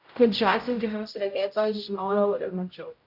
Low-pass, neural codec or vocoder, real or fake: 5.4 kHz; codec, 16 kHz, 0.5 kbps, X-Codec, HuBERT features, trained on general audio; fake